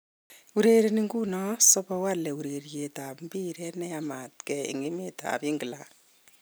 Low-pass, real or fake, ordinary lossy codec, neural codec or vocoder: none; real; none; none